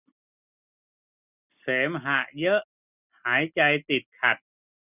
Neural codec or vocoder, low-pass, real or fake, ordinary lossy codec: none; 3.6 kHz; real; none